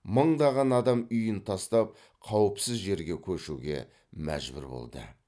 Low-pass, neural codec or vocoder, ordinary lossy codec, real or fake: none; none; none; real